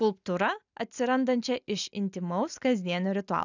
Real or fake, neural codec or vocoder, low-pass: real; none; 7.2 kHz